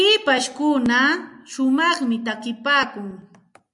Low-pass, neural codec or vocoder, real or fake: 10.8 kHz; none; real